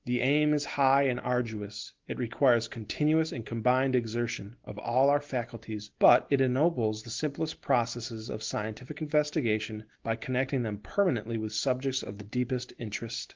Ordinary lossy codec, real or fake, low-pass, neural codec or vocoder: Opus, 16 kbps; real; 7.2 kHz; none